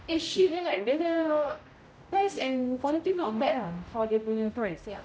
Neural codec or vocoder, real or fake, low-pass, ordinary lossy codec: codec, 16 kHz, 0.5 kbps, X-Codec, HuBERT features, trained on general audio; fake; none; none